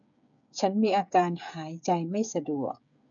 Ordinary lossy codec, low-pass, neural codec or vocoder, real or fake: none; 7.2 kHz; codec, 16 kHz, 8 kbps, FreqCodec, smaller model; fake